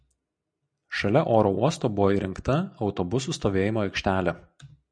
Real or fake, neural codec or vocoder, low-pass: real; none; 9.9 kHz